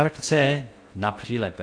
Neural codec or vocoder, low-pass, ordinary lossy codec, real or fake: codec, 16 kHz in and 24 kHz out, 0.6 kbps, FocalCodec, streaming, 2048 codes; 9.9 kHz; AAC, 48 kbps; fake